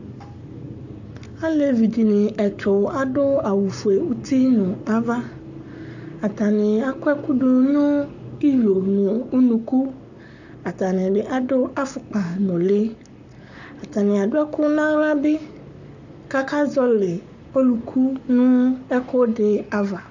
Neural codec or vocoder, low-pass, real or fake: codec, 44.1 kHz, 7.8 kbps, Pupu-Codec; 7.2 kHz; fake